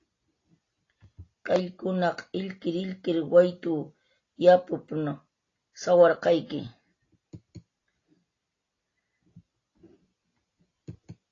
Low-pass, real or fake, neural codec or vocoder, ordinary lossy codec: 7.2 kHz; real; none; AAC, 32 kbps